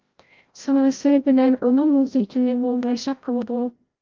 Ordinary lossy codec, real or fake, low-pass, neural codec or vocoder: Opus, 32 kbps; fake; 7.2 kHz; codec, 16 kHz, 0.5 kbps, FreqCodec, larger model